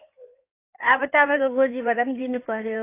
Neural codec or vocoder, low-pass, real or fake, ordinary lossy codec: codec, 16 kHz in and 24 kHz out, 2.2 kbps, FireRedTTS-2 codec; 3.6 kHz; fake; AAC, 24 kbps